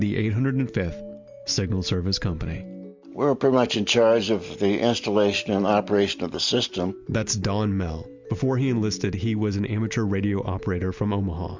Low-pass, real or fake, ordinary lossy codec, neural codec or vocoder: 7.2 kHz; real; MP3, 64 kbps; none